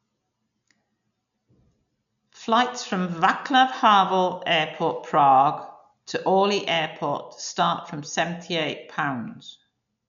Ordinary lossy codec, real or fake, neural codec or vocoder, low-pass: none; real; none; 7.2 kHz